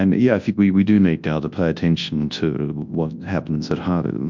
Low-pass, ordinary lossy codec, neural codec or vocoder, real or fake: 7.2 kHz; MP3, 64 kbps; codec, 24 kHz, 0.9 kbps, WavTokenizer, large speech release; fake